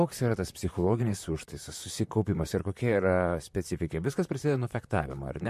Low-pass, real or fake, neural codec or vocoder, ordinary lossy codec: 14.4 kHz; fake; vocoder, 44.1 kHz, 128 mel bands, Pupu-Vocoder; MP3, 64 kbps